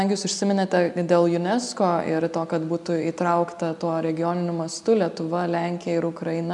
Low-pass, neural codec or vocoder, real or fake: 10.8 kHz; none; real